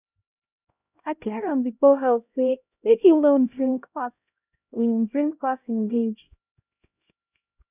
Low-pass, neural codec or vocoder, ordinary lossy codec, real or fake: 3.6 kHz; codec, 16 kHz, 0.5 kbps, X-Codec, HuBERT features, trained on LibriSpeech; none; fake